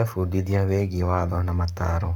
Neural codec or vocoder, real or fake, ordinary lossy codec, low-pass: codec, 44.1 kHz, 7.8 kbps, Pupu-Codec; fake; none; 19.8 kHz